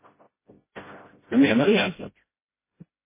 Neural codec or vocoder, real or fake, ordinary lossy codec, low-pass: codec, 16 kHz, 0.5 kbps, FreqCodec, smaller model; fake; MP3, 16 kbps; 3.6 kHz